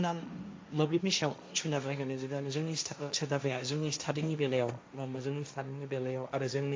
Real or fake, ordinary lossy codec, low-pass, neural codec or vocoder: fake; none; none; codec, 16 kHz, 1.1 kbps, Voila-Tokenizer